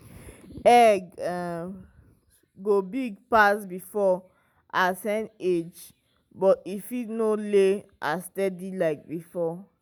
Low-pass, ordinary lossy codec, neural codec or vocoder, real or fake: none; none; none; real